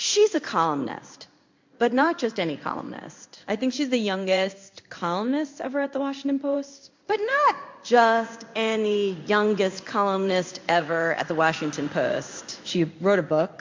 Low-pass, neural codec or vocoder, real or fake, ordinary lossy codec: 7.2 kHz; codec, 16 kHz in and 24 kHz out, 1 kbps, XY-Tokenizer; fake; MP3, 48 kbps